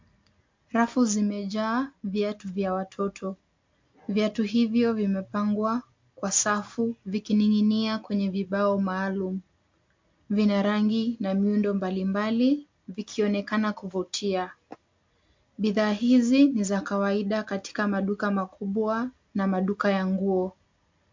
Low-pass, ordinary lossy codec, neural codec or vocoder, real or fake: 7.2 kHz; MP3, 48 kbps; none; real